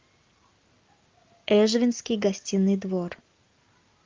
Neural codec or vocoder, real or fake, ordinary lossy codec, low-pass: none; real; Opus, 24 kbps; 7.2 kHz